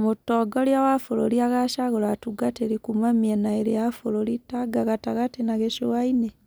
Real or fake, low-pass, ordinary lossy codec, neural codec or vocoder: real; none; none; none